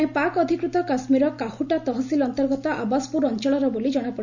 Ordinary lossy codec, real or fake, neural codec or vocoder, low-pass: none; real; none; 7.2 kHz